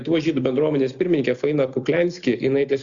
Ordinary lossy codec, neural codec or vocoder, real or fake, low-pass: Opus, 64 kbps; none; real; 7.2 kHz